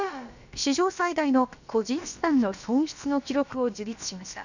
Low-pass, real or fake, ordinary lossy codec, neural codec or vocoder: 7.2 kHz; fake; none; codec, 16 kHz, about 1 kbps, DyCAST, with the encoder's durations